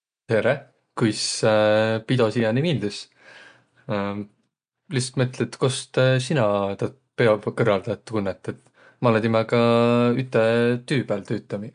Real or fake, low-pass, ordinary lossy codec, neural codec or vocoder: real; 9.9 kHz; MP3, 64 kbps; none